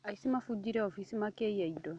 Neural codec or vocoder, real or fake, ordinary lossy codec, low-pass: none; real; none; 9.9 kHz